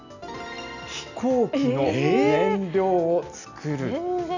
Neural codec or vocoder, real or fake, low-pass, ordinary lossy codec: none; real; 7.2 kHz; none